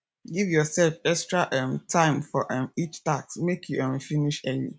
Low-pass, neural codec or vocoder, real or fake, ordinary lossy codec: none; none; real; none